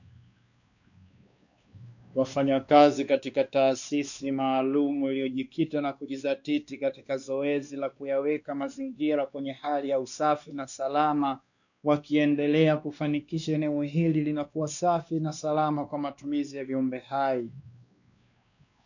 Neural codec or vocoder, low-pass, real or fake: codec, 16 kHz, 2 kbps, X-Codec, WavLM features, trained on Multilingual LibriSpeech; 7.2 kHz; fake